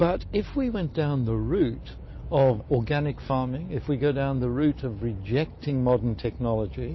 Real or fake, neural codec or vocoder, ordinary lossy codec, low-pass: real; none; MP3, 24 kbps; 7.2 kHz